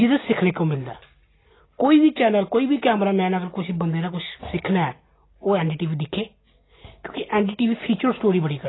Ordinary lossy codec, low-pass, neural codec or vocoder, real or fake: AAC, 16 kbps; 7.2 kHz; none; real